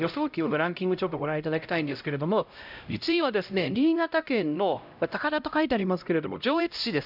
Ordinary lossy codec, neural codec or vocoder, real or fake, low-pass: none; codec, 16 kHz, 0.5 kbps, X-Codec, HuBERT features, trained on LibriSpeech; fake; 5.4 kHz